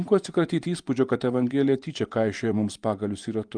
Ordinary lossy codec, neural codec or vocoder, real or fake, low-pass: Opus, 32 kbps; vocoder, 24 kHz, 100 mel bands, Vocos; fake; 9.9 kHz